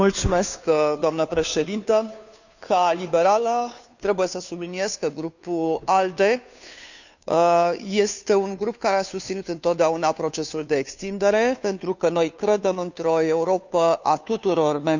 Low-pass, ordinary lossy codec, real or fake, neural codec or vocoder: 7.2 kHz; none; fake; codec, 16 kHz, 2 kbps, FunCodec, trained on Chinese and English, 25 frames a second